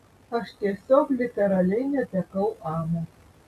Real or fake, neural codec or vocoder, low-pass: real; none; 14.4 kHz